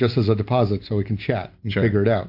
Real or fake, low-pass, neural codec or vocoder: real; 5.4 kHz; none